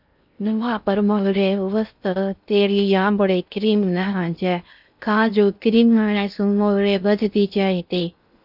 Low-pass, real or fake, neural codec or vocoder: 5.4 kHz; fake; codec, 16 kHz in and 24 kHz out, 0.6 kbps, FocalCodec, streaming, 2048 codes